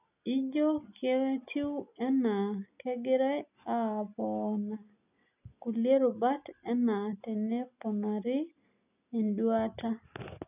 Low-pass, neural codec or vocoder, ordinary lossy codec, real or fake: 3.6 kHz; none; none; real